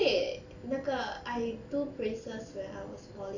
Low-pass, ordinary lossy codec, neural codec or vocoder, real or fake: 7.2 kHz; none; none; real